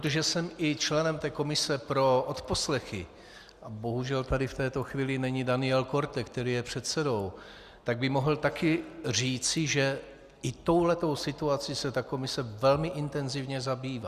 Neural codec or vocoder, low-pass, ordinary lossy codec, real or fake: none; 14.4 kHz; Opus, 64 kbps; real